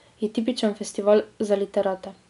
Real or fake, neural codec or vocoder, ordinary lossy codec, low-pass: real; none; none; 10.8 kHz